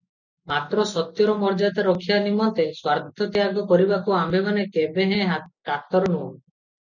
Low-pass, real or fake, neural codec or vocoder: 7.2 kHz; real; none